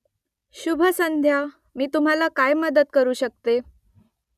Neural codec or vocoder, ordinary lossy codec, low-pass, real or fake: none; none; 14.4 kHz; real